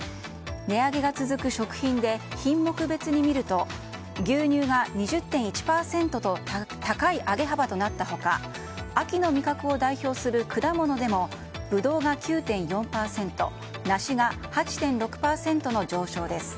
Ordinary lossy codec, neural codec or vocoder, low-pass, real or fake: none; none; none; real